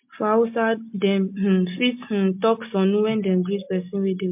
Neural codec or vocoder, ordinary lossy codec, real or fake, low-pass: none; MP3, 32 kbps; real; 3.6 kHz